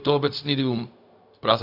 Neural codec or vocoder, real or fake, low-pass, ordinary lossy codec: codec, 16 kHz, 0.4 kbps, LongCat-Audio-Codec; fake; 5.4 kHz; AAC, 48 kbps